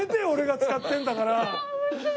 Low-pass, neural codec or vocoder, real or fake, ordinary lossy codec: none; none; real; none